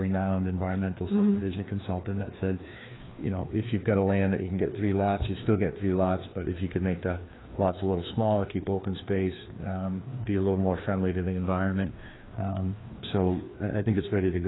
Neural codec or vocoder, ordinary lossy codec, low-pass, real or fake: codec, 16 kHz, 2 kbps, FreqCodec, larger model; AAC, 16 kbps; 7.2 kHz; fake